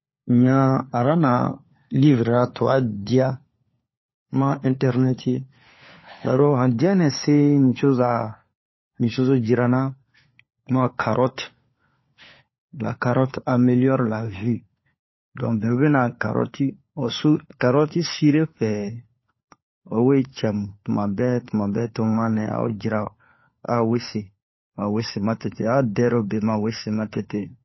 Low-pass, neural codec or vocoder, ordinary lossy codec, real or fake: 7.2 kHz; codec, 16 kHz, 4 kbps, FunCodec, trained on LibriTTS, 50 frames a second; MP3, 24 kbps; fake